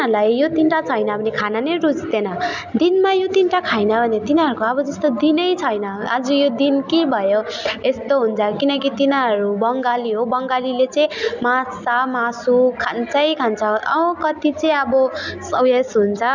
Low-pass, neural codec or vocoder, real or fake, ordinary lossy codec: 7.2 kHz; none; real; none